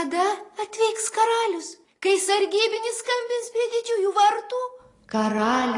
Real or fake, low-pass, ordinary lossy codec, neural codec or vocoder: real; 10.8 kHz; AAC, 32 kbps; none